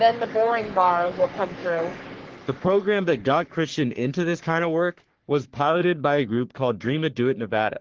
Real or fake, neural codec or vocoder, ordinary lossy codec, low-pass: fake; codec, 44.1 kHz, 3.4 kbps, Pupu-Codec; Opus, 16 kbps; 7.2 kHz